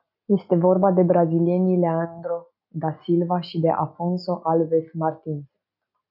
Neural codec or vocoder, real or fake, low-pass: none; real; 5.4 kHz